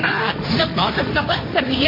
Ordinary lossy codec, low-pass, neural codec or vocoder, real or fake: MP3, 24 kbps; 5.4 kHz; codec, 16 kHz, 1.1 kbps, Voila-Tokenizer; fake